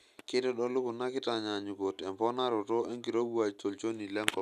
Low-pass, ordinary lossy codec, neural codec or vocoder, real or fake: 14.4 kHz; none; none; real